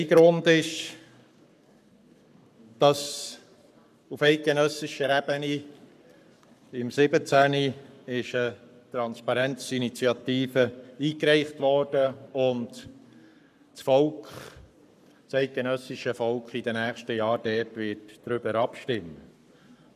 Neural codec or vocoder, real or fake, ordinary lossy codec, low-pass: codec, 44.1 kHz, 7.8 kbps, Pupu-Codec; fake; none; 14.4 kHz